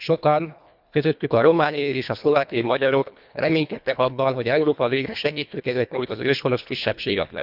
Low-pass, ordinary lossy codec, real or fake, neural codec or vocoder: 5.4 kHz; none; fake; codec, 24 kHz, 1.5 kbps, HILCodec